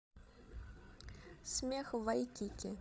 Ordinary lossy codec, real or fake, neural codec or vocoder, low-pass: none; fake; codec, 16 kHz, 16 kbps, FreqCodec, larger model; none